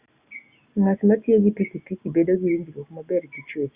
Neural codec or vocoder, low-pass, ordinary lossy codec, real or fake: none; 3.6 kHz; Opus, 64 kbps; real